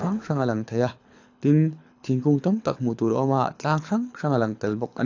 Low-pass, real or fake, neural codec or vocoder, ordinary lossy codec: 7.2 kHz; fake; codec, 24 kHz, 6 kbps, HILCodec; AAC, 48 kbps